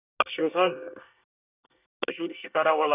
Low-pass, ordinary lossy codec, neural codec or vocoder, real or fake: 3.6 kHz; none; codec, 24 kHz, 1 kbps, SNAC; fake